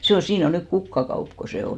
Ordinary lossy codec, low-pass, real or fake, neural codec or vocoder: none; none; real; none